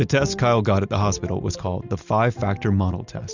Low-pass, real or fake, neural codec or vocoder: 7.2 kHz; real; none